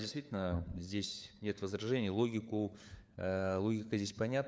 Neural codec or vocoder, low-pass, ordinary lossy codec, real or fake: codec, 16 kHz, 4 kbps, FunCodec, trained on LibriTTS, 50 frames a second; none; none; fake